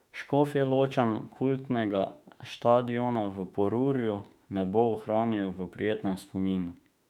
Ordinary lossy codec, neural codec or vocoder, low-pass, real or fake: none; autoencoder, 48 kHz, 32 numbers a frame, DAC-VAE, trained on Japanese speech; 19.8 kHz; fake